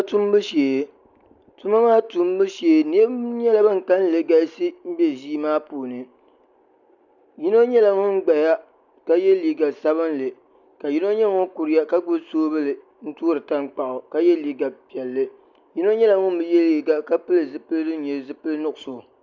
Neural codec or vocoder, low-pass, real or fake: none; 7.2 kHz; real